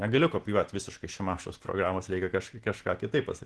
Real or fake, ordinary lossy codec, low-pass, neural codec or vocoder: real; Opus, 16 kbps; 10.8 kHz; none